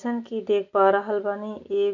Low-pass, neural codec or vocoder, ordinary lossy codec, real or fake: 7.2 kHz; none; none; real